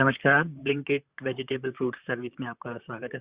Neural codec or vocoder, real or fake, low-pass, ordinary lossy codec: vocoder, 44.1 kHz, 128 mel bands, Pupu-Vocoder; fake; 3.6 kHz; Opus, 64 kbps